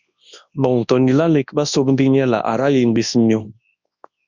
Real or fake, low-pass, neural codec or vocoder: fake; 7.2 kHz; codec, 24 kHz, 0.9 kbps, WavTokenizer, large speech release